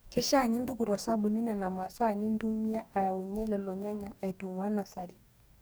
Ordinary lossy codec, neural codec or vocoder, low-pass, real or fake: none; codec, 44.1 kHz, 2.6 kbps, DAC; none; fake